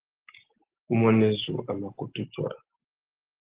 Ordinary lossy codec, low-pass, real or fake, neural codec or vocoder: Opus, 16 kbps; 3.6 kHz; real; none